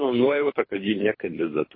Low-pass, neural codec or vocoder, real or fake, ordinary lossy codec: 5.4 kHz; codec, 24 kHz, 3 kbps, HILCodec; fake; MP3, 24 kbps